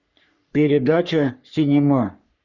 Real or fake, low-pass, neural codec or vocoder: fake; 7.2 kHz; codec, 44.1 kHz, 3.4 kbps, Pupu-Codec